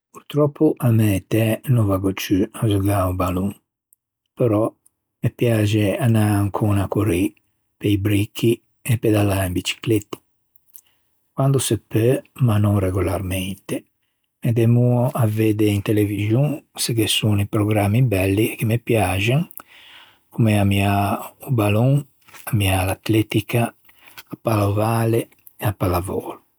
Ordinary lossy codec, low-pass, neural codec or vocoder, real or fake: none; none; none; real